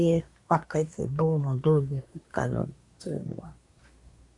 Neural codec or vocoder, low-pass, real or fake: codec, 24 kHz, 1 kbps, SNAC; 10.8 kHz; fake